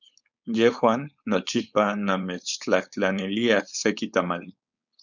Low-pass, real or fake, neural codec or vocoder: 7.2 kHz; fake; codec, 16 kHz, 4.8 kbps, FACodec